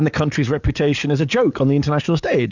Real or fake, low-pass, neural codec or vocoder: fake; 7.2 kHz; codec, 44.1 kHz, 7.8 kbps, DAC